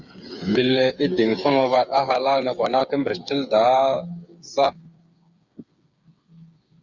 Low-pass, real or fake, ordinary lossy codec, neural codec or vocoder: 7.2 kHz; fake; Opus, 64 kbps; codec, 16 kHz, 8 kbps, FreqCodec, smaller model